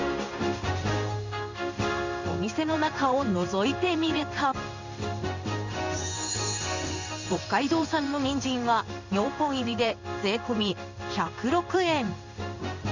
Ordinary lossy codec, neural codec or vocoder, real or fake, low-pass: Opus, 64 kbps; codec, 16 kHz in and 24 kHz out, 1 kbps, XY-Tokenizer; fake; 7.2 kHz